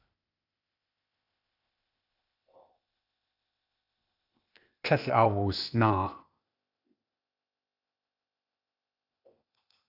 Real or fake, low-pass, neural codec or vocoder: fake; 5.4 kHz; codec, 16 kHz, 0.8 kbps, ZipCodec